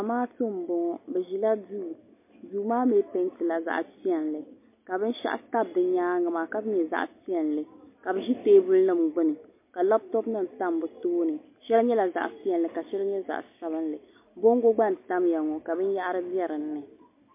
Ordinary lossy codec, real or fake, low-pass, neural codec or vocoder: MP3, 24 kbps; real; 3.6 kHz; none